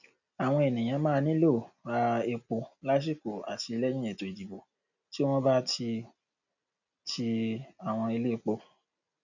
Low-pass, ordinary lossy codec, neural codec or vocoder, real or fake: 7.2 kHz; none; none; real